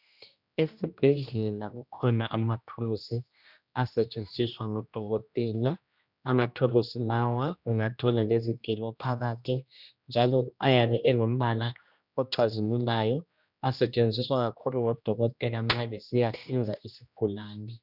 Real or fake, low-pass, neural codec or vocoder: fake; 5.4 kHz; codec, 16 kHz, 1 kbps, X-Codec, HuBERT features, trained on general audio